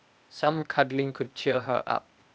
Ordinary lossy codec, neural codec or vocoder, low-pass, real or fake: none; codec, 16 kHz, 0.8 kbps, ZipCodec; none; fake